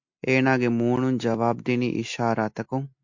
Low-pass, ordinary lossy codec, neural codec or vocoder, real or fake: 7.2 kHz; MP3, 48 kbps; none; real